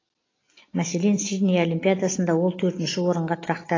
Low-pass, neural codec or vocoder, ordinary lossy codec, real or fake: 7.2 kHz; none; AAC, 32 kbps; real